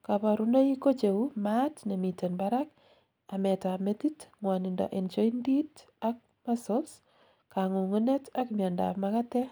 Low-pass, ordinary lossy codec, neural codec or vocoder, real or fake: none; none; none; real